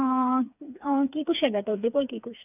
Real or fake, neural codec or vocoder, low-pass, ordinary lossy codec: fake; codec, 16 kHz, 4 kbps, FreqCodec, smaller model; 3.6 kHz; none